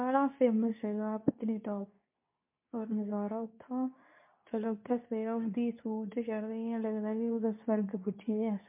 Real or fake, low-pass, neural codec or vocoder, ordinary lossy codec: fake; 3.6 kHz; codec, 24 kHz, 0.9 kbps, WavTokenizer, medium speech release version 2; MP3, 32 kbps